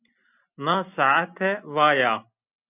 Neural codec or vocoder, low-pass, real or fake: none; 3.6 kHz; real